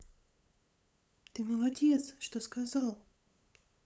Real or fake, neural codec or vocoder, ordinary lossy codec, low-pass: fake; codec, 16 kHz, 8 kbps, FunCodec, trained on LibriTTS, 25 frames a second; none; none